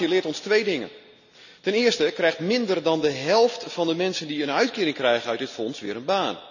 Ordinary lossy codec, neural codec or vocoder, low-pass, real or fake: none; none; 7.2 kHz; real